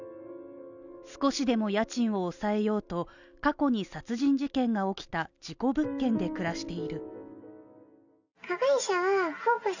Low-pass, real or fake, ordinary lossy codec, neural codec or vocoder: 7.2 kHz; real; none; none